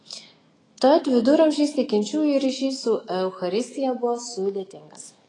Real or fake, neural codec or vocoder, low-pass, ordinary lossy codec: fake; autoencoder, 48 kHz, 128 numbers a frame, DAC-VAE, trained on Japanese speech; 10.8 kHz; AAC, 32 kbps